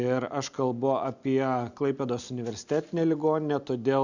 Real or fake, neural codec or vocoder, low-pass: real; none; 7.2 kHz